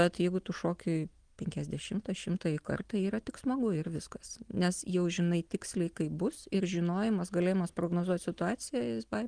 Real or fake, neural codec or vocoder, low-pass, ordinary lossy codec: real; none; 9.9 kHz; Opus, 24 kbps